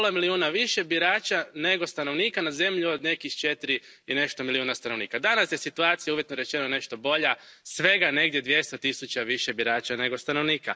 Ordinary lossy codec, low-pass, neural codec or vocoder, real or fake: none; none; none; real